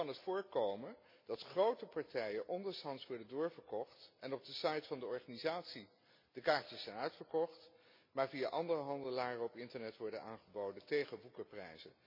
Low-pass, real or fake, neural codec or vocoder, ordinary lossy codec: 5.4 kHz; real; none; MP3, 32 kbps